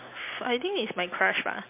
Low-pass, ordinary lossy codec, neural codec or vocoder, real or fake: 3.6 kHz; MP3, 24 kbps; none; real